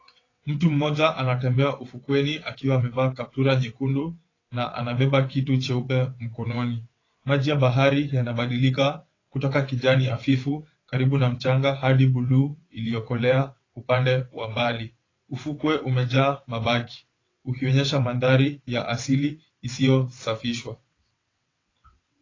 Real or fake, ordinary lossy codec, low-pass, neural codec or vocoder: fake; AAC, 32 kbps; 7.2 kHz; vocoder, 22.05 kHz, 80 mel bands, WaveNeXt